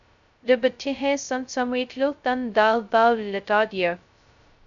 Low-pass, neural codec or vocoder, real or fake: 7.2 kHz; codec, 16 kHz, 0.2 kbps, FocalCodec; fake